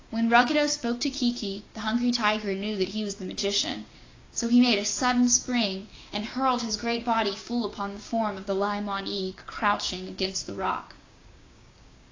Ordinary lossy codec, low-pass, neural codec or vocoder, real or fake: AAC, 32 kbps; 7.2 kHz; codec, 16 kHz, 6 kbps, DAC; fake